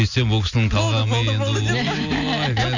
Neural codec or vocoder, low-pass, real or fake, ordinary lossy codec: none; 7.2 kHz; real; none